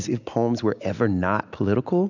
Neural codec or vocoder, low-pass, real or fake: none; 7.2 kHz; real